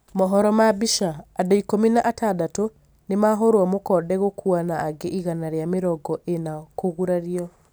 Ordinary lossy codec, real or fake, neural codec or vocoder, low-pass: none; real; none; none